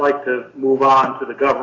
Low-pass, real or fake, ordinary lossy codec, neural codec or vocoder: 7.2 kHz; real; AAC, 32 kbps; none